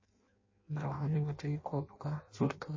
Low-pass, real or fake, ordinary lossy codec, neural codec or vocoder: 7.2 kHz; fake; MP3, 32 kbps; codec, 16 kHz in and 24 kHz out, 0.6 kbps, FireRedTTS-2 codec